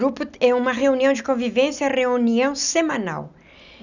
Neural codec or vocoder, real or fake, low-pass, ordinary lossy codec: none; real; 7.2 kHz; none